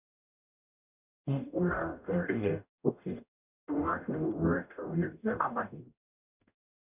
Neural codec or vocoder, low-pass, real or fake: codec, 44.1 kHz, 0.9 kbps, DAC; 3.6 kHz; fake